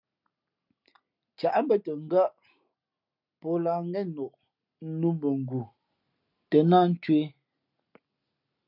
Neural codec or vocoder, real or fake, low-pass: none; real; 5.4 kHz